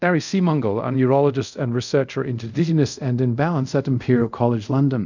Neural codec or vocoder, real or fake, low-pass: codec, 24 kHz, 0.5 kbps, DualCodec; fake; 7.2 kHz